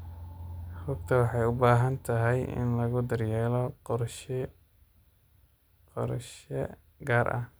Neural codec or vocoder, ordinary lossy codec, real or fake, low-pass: none; none; real; none